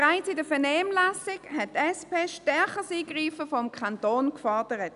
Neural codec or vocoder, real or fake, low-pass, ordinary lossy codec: none; real; 10.8 kHz; none